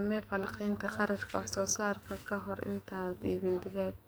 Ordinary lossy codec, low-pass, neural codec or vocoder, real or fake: none; none; codec, 44.1 kHz, 2.6 kbps, SNAC; fake